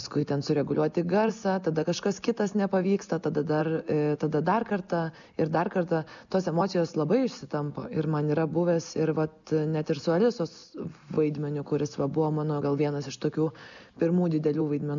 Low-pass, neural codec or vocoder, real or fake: 7.2 kHz; none; real